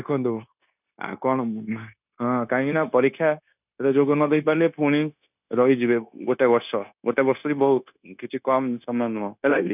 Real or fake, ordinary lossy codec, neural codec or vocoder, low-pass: fake; none; codec, 16 kHz, 0.9 kbps, LongCat-Audio-Codec; 3.6 kHz